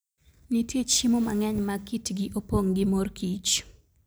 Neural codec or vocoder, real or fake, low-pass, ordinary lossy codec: none; real; none; none